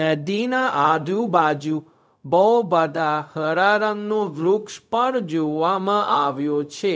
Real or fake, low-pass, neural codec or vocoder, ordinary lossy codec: fake; none; codec, 16 kHz, 0.4 kbps, LongCat-Audio-Codec; none